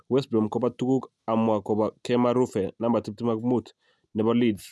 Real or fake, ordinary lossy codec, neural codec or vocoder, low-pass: real; none; none; none